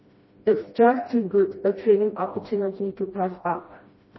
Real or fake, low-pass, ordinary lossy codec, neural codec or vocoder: fake; 7.2 kHz; MP3, 24 kbps; codec, 16 kHz, 1 kbps, FreqCodec, smaller model